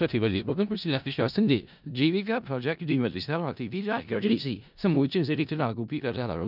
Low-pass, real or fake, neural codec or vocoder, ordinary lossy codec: 5.4 kHz; fake; codec, 16 kHz in and 24 kHz out, 0.4 kbps, LongCat-Audio-Codec, four codebook decoder; none